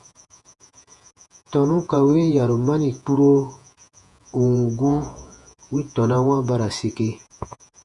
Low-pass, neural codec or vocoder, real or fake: 10.8 kHz; vocoder, 48 kHz, 128 mel bands, Vocos; fake